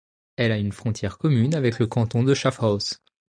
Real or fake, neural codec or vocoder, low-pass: real; none; 9.9 kHz